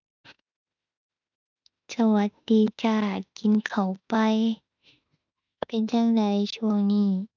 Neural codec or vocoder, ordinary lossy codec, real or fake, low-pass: autoencoder, 48 kHz, 32 numbers a frame, DAC-VAE, trained on Japanese speech; none; fake; 7.2 kHz